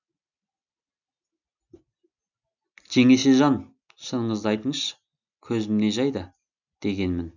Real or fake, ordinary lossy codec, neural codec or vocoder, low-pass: real; none; none; 7.2 kHz